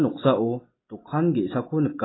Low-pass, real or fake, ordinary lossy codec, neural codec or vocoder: 7.2 kHz; real; AAC, 16 kbps; none